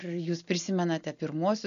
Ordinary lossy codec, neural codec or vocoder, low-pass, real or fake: AAC, 96 kbps; none; 7.2 kHz; real